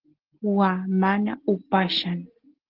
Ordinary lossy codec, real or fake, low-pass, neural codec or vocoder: Opus, 16 kbps; real; 5.4 kHz; none